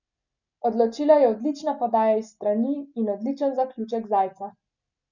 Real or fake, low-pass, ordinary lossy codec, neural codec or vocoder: real; 7.2 kHz; none; none